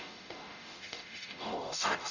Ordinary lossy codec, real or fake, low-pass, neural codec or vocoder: none; fake; 7.2 kHz; codec, 44.1 kHz, 0.9 kbps, DAC